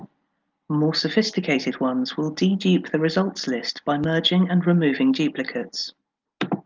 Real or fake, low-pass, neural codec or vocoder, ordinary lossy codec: real; 7.2 kHz; none; Opus, 24 kbps